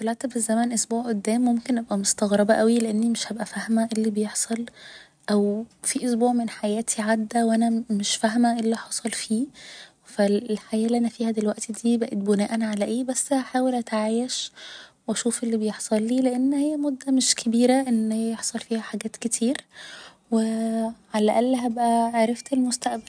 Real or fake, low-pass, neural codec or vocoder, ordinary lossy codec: real; 9.9 kHz; none; none